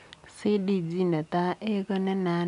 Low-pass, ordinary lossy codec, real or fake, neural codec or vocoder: 10.8 kHz; none; real; none